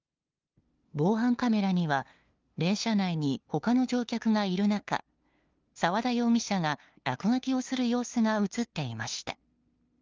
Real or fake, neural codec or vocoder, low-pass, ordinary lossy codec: fake; codec, 16 kHz, 2 kbps, FunCodec, trained on LibriTTS, 25 frames a second; 7.2 kHz; Opus, 32 kbps